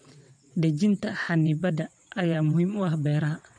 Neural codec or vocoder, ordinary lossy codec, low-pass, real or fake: vocoder, 22.05 kHz, 80 mel bands, WaveNeXt; MP3, 64 kbps; 9.9 kHz; fake